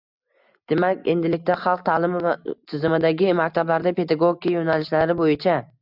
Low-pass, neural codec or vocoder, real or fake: 5.4 kHz; none; real